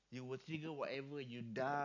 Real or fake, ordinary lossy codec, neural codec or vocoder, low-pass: real; none; none; 7.2 kHz